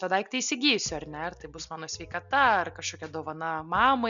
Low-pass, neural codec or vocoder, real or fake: 7.2 kHz; none; real